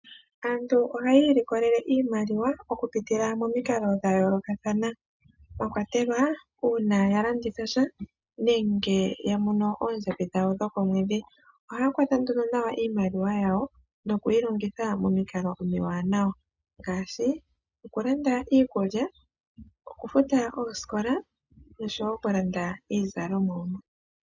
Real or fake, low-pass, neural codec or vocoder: real; 7.2 kHz; none